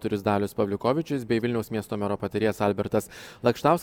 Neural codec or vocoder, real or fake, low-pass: none; real; 19.8 kHz